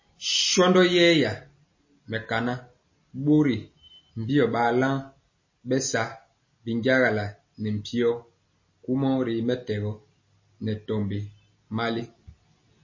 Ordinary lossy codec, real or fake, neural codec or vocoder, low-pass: MP3, 32 kbps; real; none; 7.2 kHz